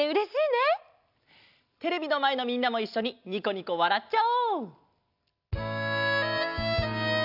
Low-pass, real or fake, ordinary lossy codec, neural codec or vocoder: 5.4 kHz; real; none; none